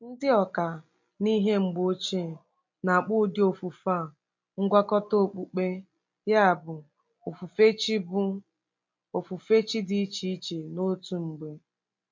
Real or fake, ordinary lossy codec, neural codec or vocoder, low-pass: real; MP3, 48 kbps; none; 7.2 kHz